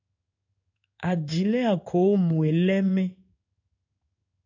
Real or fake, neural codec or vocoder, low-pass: fake; codec, 16 kHz in and 24 kHz out, 1 kbps, XY-Tokenizer; 7.2 kHz